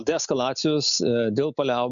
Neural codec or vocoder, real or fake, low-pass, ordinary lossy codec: none; real; 7.2 kHz; MP3, 96 kbps